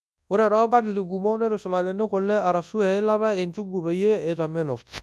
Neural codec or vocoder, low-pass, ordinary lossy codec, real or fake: codec, 24 kHz, 0.9 kbps, WavTokenizer, large speech release; none; none; fake